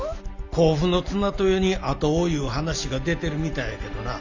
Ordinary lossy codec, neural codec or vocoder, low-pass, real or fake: Opus, 64 kbps; none; 7.2 kHz; real